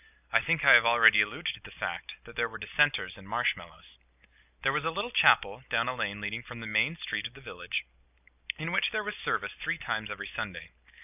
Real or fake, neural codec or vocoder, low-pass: real; none; 3.6 kHz